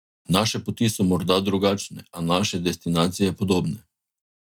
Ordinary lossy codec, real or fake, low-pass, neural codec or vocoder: none; real; 19.8 kHz; none